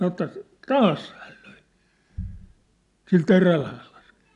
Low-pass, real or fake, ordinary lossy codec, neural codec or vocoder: 10.8 kHz; real; AAC, 96 kbps; none